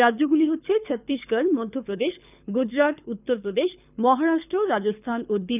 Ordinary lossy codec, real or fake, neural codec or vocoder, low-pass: none; fake; codec, 24 kHz, 6 kbps, HILCodec; 3.6 kHz